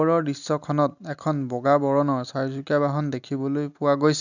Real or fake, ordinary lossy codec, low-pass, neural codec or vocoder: real; none; 7.2 kHz; none